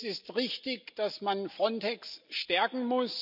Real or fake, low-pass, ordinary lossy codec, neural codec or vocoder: real; 5.4 kHz; none; none